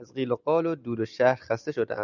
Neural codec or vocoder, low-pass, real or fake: none; 7.2 kHz; real